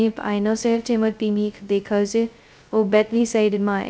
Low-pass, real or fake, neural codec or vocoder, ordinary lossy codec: none; fake; codec, 16 kHz, 0.2 kbps, FocalCodec; none